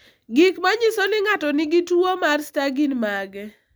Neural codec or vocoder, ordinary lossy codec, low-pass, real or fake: none; none; none; real